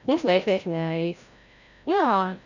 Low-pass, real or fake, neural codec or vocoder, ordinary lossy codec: 7.2 kHz; fake; codec, 16 kHz, 0.5 kbps, FreqCodec, larger model; none